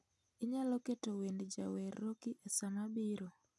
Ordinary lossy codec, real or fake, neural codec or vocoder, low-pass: none; real; none; none